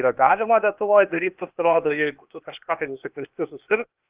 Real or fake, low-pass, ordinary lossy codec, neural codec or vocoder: fake; 3.6 kHz; Opus, 24 kbps; codec, 16 kHz, 0.8 kbps, ZipCodec